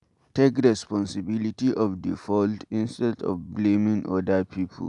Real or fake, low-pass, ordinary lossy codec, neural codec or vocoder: real; 10.8 kHz; none; none